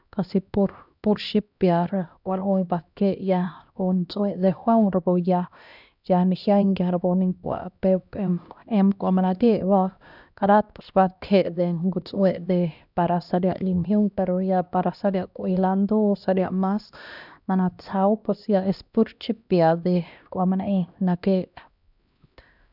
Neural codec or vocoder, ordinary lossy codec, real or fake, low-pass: codec, 16 kHz, 1 kbps, X-Codec, HuBERT features, trained on LibriSpeech; none; fake; 5.4 kHz